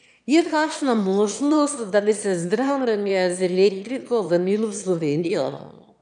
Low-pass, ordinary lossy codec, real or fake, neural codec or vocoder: 9.9 kHz; none; fake; autoencoder, 22.05 kHz, a latent of 192 numbers a frame, VITS, trained on one speaker